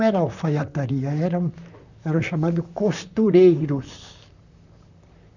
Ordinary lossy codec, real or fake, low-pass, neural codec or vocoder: none; fake; 7.2 kHz; vocoder, 44.1 kHz, 128 mel bands, Pupu-Vocoder